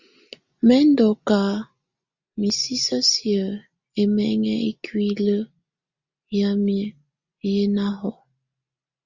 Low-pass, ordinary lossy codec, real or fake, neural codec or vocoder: 7.2 kHz; Opus, 64 kbps; real; none